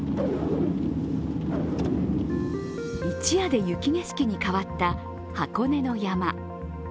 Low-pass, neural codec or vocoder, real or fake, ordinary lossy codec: none; none; real; none